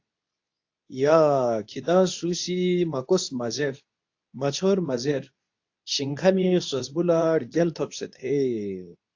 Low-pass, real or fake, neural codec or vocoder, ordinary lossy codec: 7.2 kHz; fake; codec, 24 kHz, 0.9 kbps, WavTokenizer, medium speech release version 2; AAC, 48 kbps